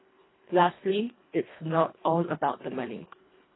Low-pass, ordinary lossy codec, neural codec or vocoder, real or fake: 7.2 kHz; AAC, 16 kbps; codec, 24 kHz, 1.5 kbps, HILCodec; fake